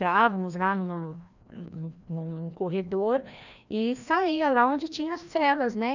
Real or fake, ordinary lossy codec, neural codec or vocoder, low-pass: fake; none; codec, 16 kHz, 1 kbps, FreqCodec, larger model; 7.2 kHz